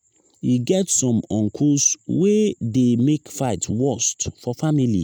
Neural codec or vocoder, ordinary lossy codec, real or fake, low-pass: none; none; real; none